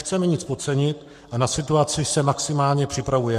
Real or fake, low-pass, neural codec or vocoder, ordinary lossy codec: fake; 14.4 kHz; codec, 44.1 kHz, 7.8 kbps, Pupu-Codec; MP3, 64 kbps